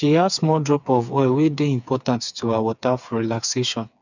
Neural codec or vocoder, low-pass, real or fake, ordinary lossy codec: codec, 16 kHz, 4 kbps, FreqCodec, smaller model; 7.2 kHz; fake; none